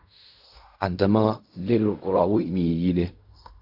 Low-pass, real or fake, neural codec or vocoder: 5.4 kHz; fake; codec, 16 kHz in and 24 kHz out, 0.4 kbps, LongCat-Audio-Codec, fine tuned four codebook decoder